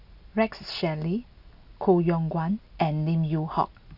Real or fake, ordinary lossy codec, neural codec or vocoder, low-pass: real; none; none; 5.4 kHz